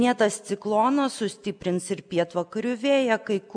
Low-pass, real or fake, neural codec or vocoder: 9.9 kHz; real; none